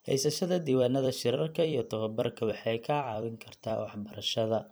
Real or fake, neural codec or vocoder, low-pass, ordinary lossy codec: fake; vocoder, 44.1 kHz, 128 mel bands every 256 samples, BigVGAN v2; none; none